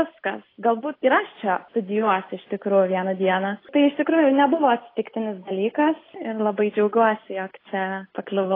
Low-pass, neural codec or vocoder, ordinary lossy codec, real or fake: 5.4 kHz; none; AAC, 24 kbps; real